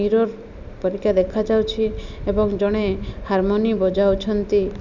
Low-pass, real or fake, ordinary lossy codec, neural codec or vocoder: 7.2 kHz; real; none; none